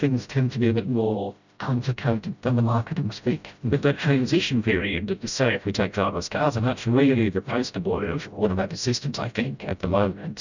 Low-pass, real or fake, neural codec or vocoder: 7.2 kHz; fake; codec, 16 kHz, 0.5 kbps, FreqCodec, smaller model